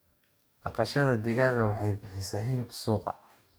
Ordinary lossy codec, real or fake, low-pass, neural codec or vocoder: none; fake; none; codec, 44.1 kHz, 2.6 kbps, DAC